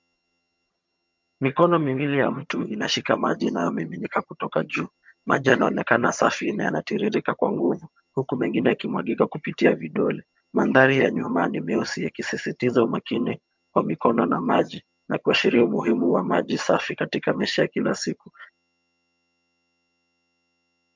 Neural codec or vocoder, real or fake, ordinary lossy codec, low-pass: vocoder, 22.05 kHz, 80 mel bands, HiFi-GAN; fake; MP3, 64 kbps; 7.2 kHz